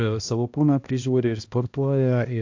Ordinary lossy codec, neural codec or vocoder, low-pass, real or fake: AAC, 48 kbps; codec, 16 kHz, 1 kbps, X-Codec, HuBERT features, trained on balanced general audio; 7.2 kHz; fake